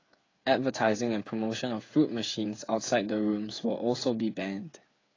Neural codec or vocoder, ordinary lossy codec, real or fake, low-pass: codec, 16 kHz, 8 kbps, FreqCodec, smaller model; AAC, 32 kbps; fake; 7.2 kHz